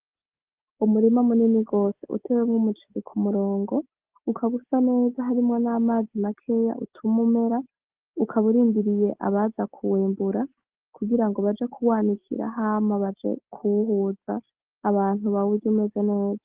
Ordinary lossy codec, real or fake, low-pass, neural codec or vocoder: Opus, 16 kbps; real; 3.6 kHz; none